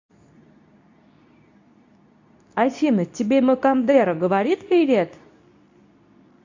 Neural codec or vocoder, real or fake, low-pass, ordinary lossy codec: codec, 24 kHz, 0.9 kbps, WavTokenizer, medium speech release version 2; fake; 7.2 kHz; AAC, 48 kbps